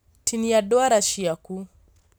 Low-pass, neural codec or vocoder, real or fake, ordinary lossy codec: none; none; real; none